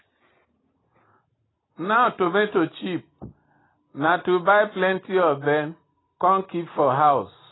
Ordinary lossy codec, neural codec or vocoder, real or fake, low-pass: AAC, 16 kbps; none; real; 7.2 kHz